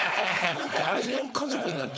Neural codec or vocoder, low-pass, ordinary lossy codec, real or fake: codec, 16 kHz, 4.8 kbps, FACodec; none; none; fake